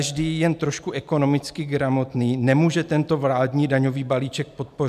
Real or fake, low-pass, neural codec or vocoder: real; 14.4 kHz; none